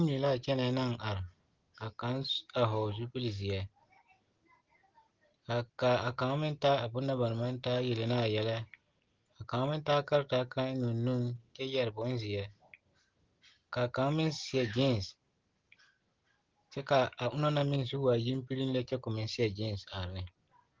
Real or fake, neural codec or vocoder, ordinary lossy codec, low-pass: real; none; Opus, 16 kbps; 7.2 kHz